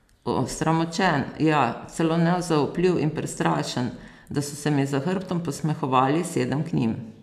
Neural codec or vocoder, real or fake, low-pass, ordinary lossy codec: none; real; 14.4 kHz; none